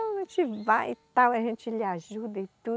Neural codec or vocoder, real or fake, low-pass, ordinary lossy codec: none; real; none; none